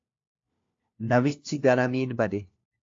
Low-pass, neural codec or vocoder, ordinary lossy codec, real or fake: 7.2 kHz; codec, 16 kHz, 1 kbps, FunCodec, trained on LibriTTS, 50 frames a second; AAC, 48 kbps; fake